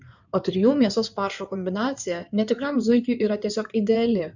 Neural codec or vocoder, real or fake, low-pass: codec, 16 kHz in and 24 kHz out, 2.2 kbps, FireRedTTS-2 codec; fake; 7.2 kHz